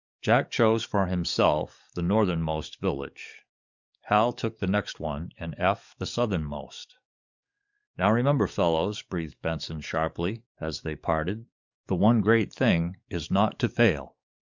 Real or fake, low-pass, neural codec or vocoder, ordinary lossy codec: fake; 7.2 kHz; codec, 44.1 kHz, 7.8 kbps, DAC; Opus, 64 kbps